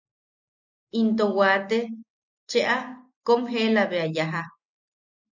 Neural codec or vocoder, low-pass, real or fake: none; 7.2 kHz; real